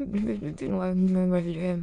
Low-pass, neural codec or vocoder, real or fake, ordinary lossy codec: 9.9 kHz; autoencoder, 22.05 kHz, a latent of 192 numbers a frame, VITS, trained on many speakers; fake; none